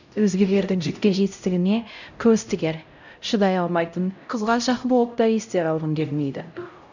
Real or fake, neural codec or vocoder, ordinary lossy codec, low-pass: fake; codec, 16 kHz, 0.5 kbps, X-Codec, HuBERT features, trained on LibriSpeech; none; 7.2 kHz